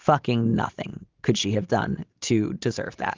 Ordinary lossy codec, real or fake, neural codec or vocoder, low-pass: Opus, 32 kbps; real; none; 7.2 kHz